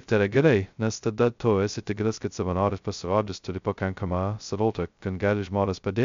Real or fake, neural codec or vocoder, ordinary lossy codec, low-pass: fake; codec, 16 kHz, 0.2 kbps, FocalCodec; MP3, 64 kbps; 7.2 kHz